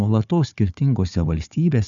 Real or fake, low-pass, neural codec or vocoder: fake; 7.2 kHz; codec, 16 kHz, 4 kbps, FunCodec, trained on Chinese and English, 50 frames a second